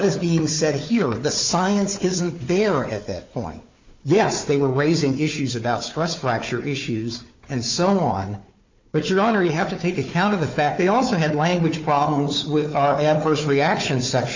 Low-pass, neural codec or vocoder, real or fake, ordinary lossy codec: 7.2 kHz; codec, 16 kHz, 4 kbps, FunCodec, trained on Chinese and English, 50 frames a second; fake; MP3, 48 kbps